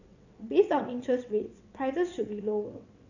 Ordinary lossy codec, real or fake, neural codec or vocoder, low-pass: none; fake; vocoder, 22.05 kHz, 80 mel bands, WaveNeXt; 7.2 kHz